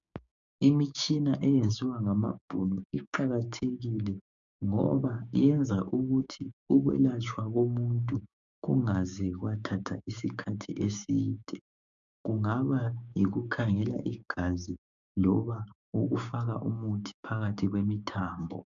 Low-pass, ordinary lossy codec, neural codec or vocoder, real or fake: 7.2 kHz; MP3, 96 kbps; codec, 16 kHz, 6 kbps, DAC; fake